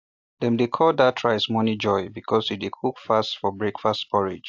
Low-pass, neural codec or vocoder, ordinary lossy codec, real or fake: 7.2 kHz; none; AAC, 48 kbps; real